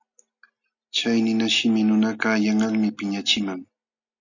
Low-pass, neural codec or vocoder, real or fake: 7.2 kHz; none; real